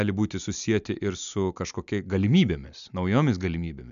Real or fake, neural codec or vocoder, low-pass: real; none; 7.2 kHz